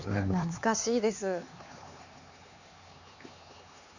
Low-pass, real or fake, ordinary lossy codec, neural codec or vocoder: 7.2 kHz; fake; none; codec, 16 kHz, 4 kbps, X-Codec, HuBERT features, trained on LibriSpeech